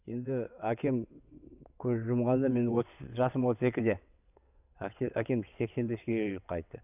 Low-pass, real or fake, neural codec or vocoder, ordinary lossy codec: 3.6 kHz; fake; vocoder, 22.05 kHz, 80 mel bands, WaveNeXt; none